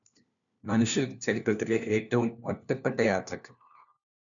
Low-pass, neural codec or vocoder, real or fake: 7.2 kHz; codec, 16 kHz, 1 kbps, FunCodec, trained on LibriTTS, 50 frames a second; fake